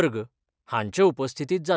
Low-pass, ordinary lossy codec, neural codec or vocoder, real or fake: none; none; none; real